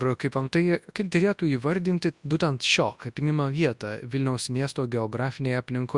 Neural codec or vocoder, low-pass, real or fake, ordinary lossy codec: codec, 24 kHz, 0.9 kbps, WavTokenizer, large speech release; 10.8 kHz; fake; Opus, 64 kbps